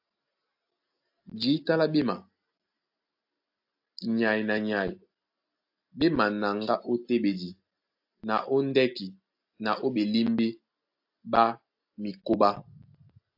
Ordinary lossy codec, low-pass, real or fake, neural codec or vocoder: AAC, 48 kbps; 5.4 kHz; real; none